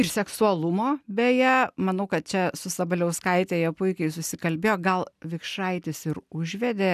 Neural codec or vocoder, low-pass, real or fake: none; 14.4 kHz; real